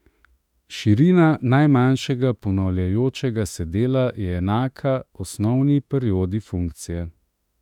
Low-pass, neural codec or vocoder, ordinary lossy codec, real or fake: 19.8 kHz; autoencoder, 48 kHz, 32 numbers a frame, DAC-VAE, trained on Japanese speech; none; fake